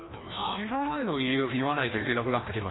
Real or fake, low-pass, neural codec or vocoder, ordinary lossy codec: fake; 7.2 kHz; codec, 16 kHz, 1 kbps, FreqCodec, larger model; AAC, 16 kbps